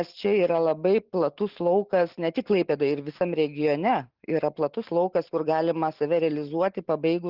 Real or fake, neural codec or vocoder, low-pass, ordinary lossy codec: real; none; 5.4 kHz; Opus, 32 kbps